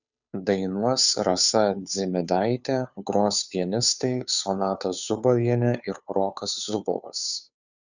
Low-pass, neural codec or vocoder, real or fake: 7.2 kHz; codec, 16 kHz, 2 kbps, FunCodec, trained on Chinese and English, 25 frames a second; fake